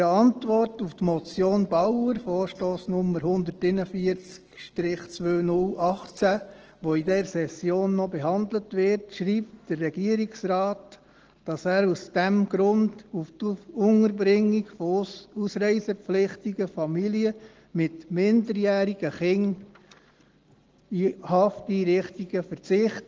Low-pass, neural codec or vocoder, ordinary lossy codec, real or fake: 7.2 kHz; none; Opus, 16 kbps; real